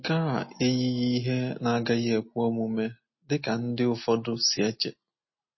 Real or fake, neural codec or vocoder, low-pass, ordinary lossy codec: real; none; 7.2 kHz; MP3, 24 kbps